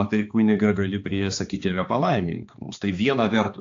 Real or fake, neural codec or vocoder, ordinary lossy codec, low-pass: fake; codec, 16 kHz, 2 kbps, X-Codec, HuBERT features, trained on balanced general audio; AAC, 48 kbps; 7.2 kHz